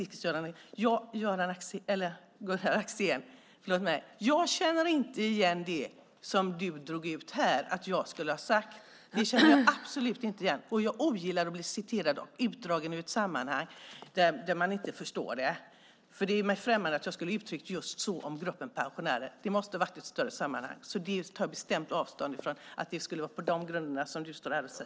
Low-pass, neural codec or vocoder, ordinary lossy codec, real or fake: none; none; none; real